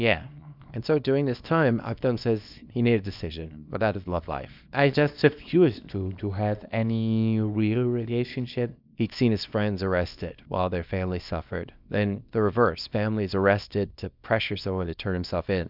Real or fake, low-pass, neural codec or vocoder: fake; 5.4 kHz; codec, 24 kHz, 0.9 kbps, WavTokenizer, small release